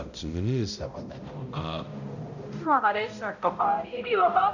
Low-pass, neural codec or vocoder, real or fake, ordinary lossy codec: 7.2 kHz; codec, 16 kHz, 0.5 kbps, X-Codec, HuBERT features, trained on balanced general audio; fake; none